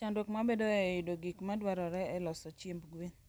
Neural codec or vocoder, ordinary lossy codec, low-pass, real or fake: none; none; none; real